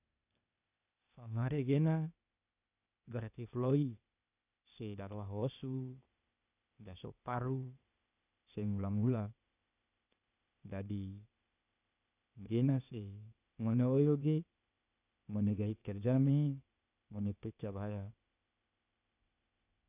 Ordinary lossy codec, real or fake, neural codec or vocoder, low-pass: none; fake; codec, 16 kHz, 0.8 kbps, ZipCodec; 3.6 kHz